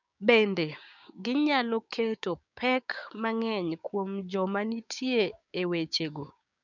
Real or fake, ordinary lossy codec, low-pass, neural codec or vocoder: fake; none; 7.2 kHz; codec, 16 kHz, 6 kbps, DAC